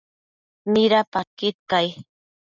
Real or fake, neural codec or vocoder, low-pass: real; none; 7.2 kHz